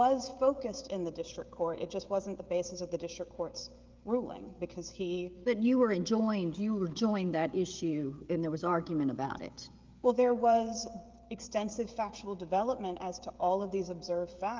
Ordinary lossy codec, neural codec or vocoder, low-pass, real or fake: Opus, 32 kbps; codec, 16 kHz, 16 kbps, FreqCodec, smaller model; 7.2 kHz; fake